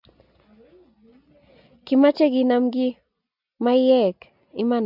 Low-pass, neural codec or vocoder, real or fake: 5.4 kHz; none; real